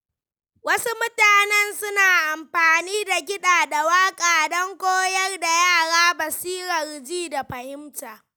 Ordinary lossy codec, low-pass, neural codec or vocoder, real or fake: none; none; none; real